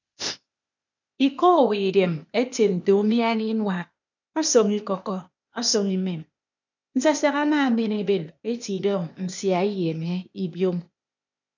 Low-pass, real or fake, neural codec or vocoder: 7.2 kHz; fake; codec, 16 kHz, 0.8 kbps, ZipCodec